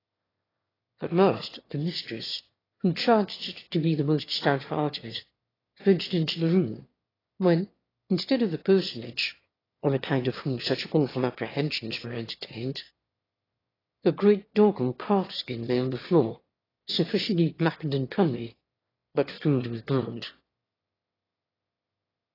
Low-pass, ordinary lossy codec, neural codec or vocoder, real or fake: 5.4 kHz; AAC, 24 kbps; autoencoder, 22.05 kHz, a latent of 192 numbers a frame, VITS, trained on one speaker; fake